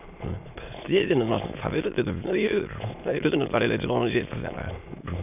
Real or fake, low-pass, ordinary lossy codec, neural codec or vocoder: fake; 3.6 kHz; none; autoencoder, 22.05 kHz, a latent of 192 numbers a frame, VITS, trained on many speakers